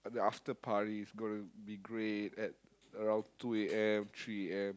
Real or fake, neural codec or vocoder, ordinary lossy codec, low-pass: real; none; none; none